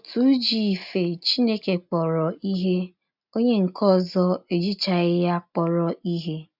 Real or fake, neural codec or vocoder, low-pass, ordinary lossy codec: real; none; 5.4 kHz; none